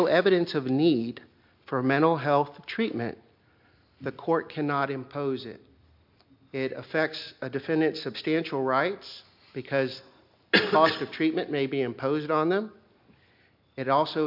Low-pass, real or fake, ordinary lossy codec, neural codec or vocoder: 5.4 kHz; real; MP3, 48 kbps; none